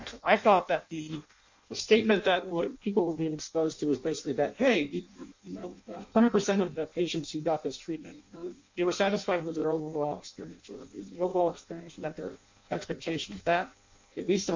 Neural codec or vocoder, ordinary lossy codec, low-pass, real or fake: codec, 16 kHz in and 24 kHz out, 0.6 kbps, FireRedTTS-2 codec; MP3, 48 kbps; 7.2 kHz; fake